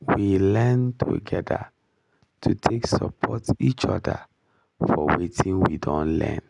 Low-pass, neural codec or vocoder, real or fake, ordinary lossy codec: 10.8 kHz; none; real; none